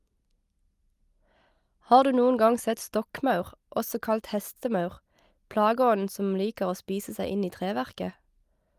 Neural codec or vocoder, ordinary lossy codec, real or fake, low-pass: none; Opus, 32 kbps; real; 14.4 kHz